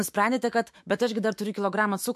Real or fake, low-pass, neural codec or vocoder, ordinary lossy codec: fake; 14.4 kHz; autoencoder, 48 kHz, 128 numbers a frame, DAC-VAE, trained on Japanese speech; MP3, 64 kbps